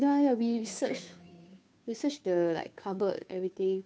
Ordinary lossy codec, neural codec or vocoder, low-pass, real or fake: none; codec, 16 kHz, 2 kbps, FunCodec, trained on Chinese and English, 25 frames a second; none; fake